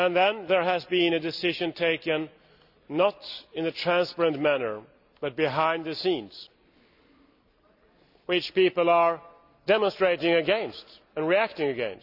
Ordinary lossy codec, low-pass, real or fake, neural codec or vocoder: none; 5.4 kHz; real; none